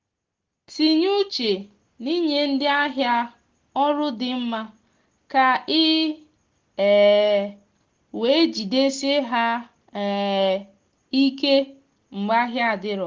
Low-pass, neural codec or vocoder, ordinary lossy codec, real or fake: 7.2 kHz; none; Opus, 16 kbps; real